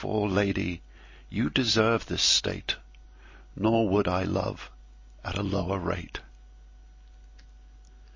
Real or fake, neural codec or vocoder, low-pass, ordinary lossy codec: real; none; 7.2 kHz; MP3, 32 kbps